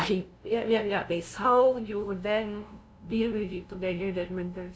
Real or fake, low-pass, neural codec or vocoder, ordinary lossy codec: fake; none; codec, 16 kHz, 0.5 kbps, FunCodec, trained on LibriTTS, 25 frames a second; none